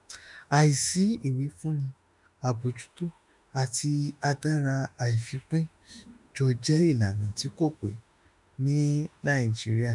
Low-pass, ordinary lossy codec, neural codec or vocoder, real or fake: 10.8 kHz; none; autoencoder, 48 kHz, 32 numbers a frame, DAC-VAE, trained on Japanese speech; fake